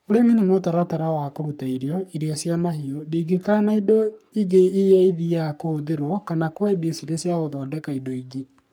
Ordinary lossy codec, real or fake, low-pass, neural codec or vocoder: none; fake; none; codec, 44.1 kHz, 3.4 kbps, Pupu-Codec